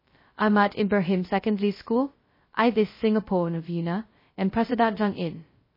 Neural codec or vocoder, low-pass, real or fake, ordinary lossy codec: codec, 16 kHz, 0.2 kbps, FocalCodec; 5.4 kHz; fake; MP3, 24 kbps